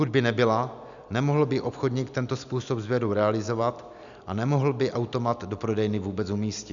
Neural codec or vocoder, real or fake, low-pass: none; real; 7.2 kHz